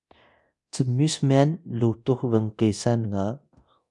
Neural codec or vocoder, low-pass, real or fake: codec, 24 kHz, 0.9 kbps, DualCodec; 10.8 kHz; fake